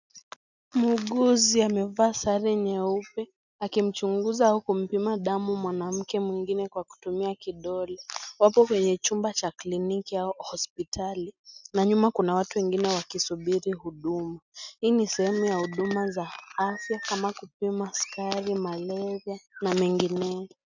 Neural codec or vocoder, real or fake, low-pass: none; real; 7.2 kHz